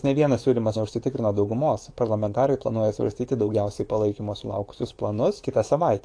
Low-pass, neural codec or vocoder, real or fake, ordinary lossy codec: 9.9 kHz; codec, 44.1 kHz, 7.8 kbps, DAC; fake; AAC, 48 kbps